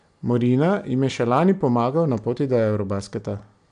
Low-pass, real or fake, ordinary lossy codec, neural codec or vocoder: 9.9 kHz; real; AAC, 96 kbps; none